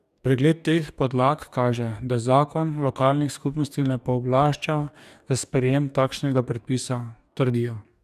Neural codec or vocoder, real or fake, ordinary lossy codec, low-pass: codec, 44.1 kHz, 2.6 kbps, DAC; fake; none; 14.4 kHz